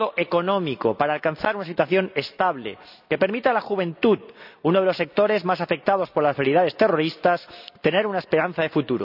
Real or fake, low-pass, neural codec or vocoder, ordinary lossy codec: real; 5.4 kHz; none; none